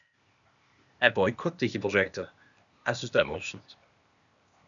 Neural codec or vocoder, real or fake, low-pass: codec, 16 kHz, 0.8 kbps, ZipCodec; fake; 7.2 kHz